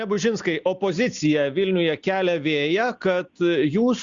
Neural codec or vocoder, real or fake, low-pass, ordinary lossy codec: none; real; 7.2 kHz; Opus, 64 kbps